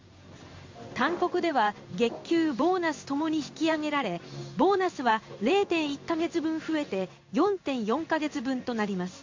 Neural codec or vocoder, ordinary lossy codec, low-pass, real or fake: codec, 16 kHz in and 24 kHz out, 1 kbps, XY-Tokenizer; MP3, 48 kbps; 7.2 kHz; fake